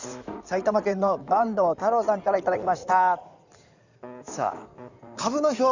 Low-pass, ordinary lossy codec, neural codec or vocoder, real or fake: 7.2 kHz; none; codec, 16 kHz in and 24 kHz out, 2.2 kbps, FireRedTTS-2 codec; fake